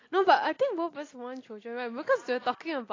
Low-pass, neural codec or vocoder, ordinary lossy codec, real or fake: 7.2 kHz; none; AAC, 32 kbps; real